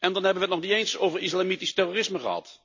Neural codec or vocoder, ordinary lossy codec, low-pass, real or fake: none; AAC, 48 kbps; 7.2 kHz; real